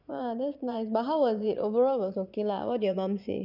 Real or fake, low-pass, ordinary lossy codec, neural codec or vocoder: fake; 5.4 kHz; none; vocoder, 22.05 kHz, 80 mel bands, Vocos